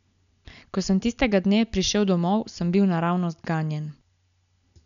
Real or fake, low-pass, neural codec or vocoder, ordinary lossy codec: real; 7.2 kHz; none; none